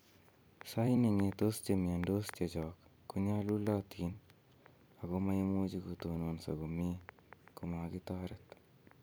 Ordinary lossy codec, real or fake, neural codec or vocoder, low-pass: none; fake; vocoder, 44.1 kHz, 128 mel bands every 256 samples, BigVGAN v2; none